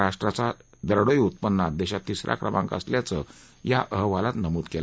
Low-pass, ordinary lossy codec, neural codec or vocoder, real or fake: none; none; none; real